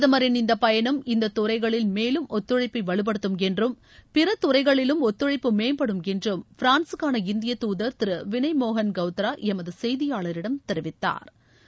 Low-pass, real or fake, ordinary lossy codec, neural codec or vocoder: none; real; none; none